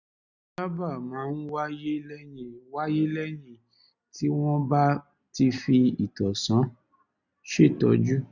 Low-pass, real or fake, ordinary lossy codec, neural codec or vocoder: 7.2 kHz; real; none; none